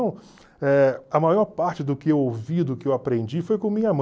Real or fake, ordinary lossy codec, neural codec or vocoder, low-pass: real; none; none; none